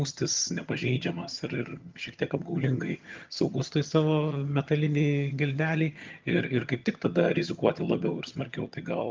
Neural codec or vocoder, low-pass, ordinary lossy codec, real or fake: vocoder, 22.05 kHz, 80 mel bands, HiFi-GAN; 7.2 kHz; Opus, 32 kbps; fake